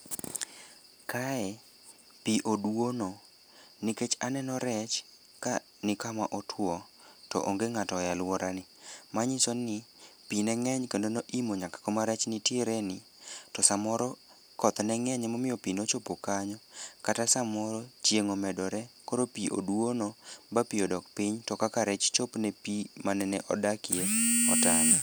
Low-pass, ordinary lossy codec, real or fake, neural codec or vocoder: none; none; real; none